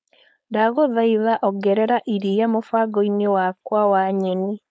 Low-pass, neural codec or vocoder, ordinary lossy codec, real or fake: none; codec, 16 kHz, 4.8 kbps, FACodec; none; fake